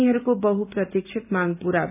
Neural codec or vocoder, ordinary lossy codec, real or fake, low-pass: none; none; real; 3.6 kHz